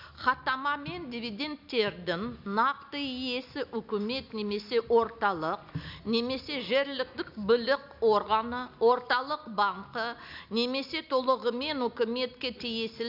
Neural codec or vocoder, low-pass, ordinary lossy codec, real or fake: none; 5.4 kHz; none; real